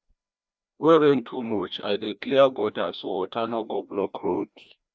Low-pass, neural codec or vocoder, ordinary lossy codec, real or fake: none; codec, 16 kHz, 1 kbps, FreqCodec, larger model; none; fake